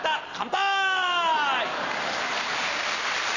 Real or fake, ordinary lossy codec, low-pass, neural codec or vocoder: real; none; 7.2 kHz; none